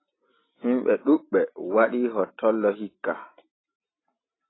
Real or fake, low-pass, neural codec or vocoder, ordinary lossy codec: real; 7.2 kHz; none; AAC, 16 kbps